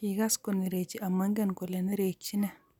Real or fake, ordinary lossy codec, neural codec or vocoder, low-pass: real; none; none; 19.8 kHz